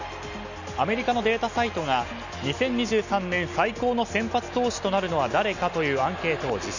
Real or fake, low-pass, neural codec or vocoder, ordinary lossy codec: real; 7.2 kHz; none; none